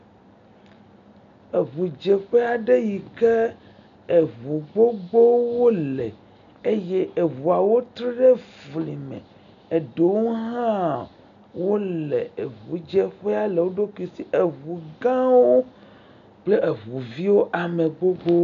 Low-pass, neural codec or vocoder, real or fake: 7.2 kHz; none; real